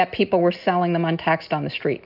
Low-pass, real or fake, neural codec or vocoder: 5.4 kHz; real; none